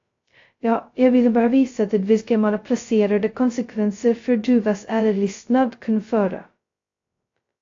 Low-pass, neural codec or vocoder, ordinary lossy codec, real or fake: 7.2 kHz; codec, 16 kHz, 0.2 kbps, FocalCodec; AAC, 48 kbps; fake